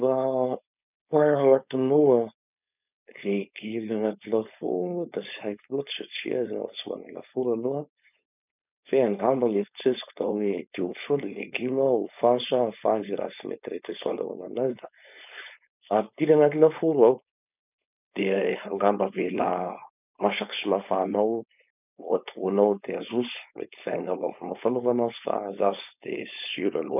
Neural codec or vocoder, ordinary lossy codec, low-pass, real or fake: codec, 16 kHz, 4.8 kbps, FACodec; none; 3.6 kHz; fake